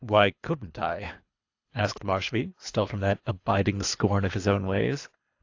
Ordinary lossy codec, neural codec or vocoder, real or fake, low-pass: AAC, 48 kbps; codec, 24 kHz, 3 kbps, HILCodec; fake; 7.2 kHz